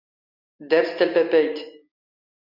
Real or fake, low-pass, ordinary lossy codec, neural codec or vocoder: real; 5.4 kHz; Opus, 64 kbps; none